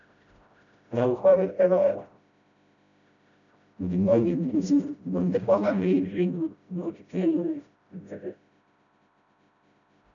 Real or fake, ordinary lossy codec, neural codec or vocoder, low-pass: fake; none; codec, 16 kHz, 0.5 kbps, FreqCodec, smaller model; 7.2 kHz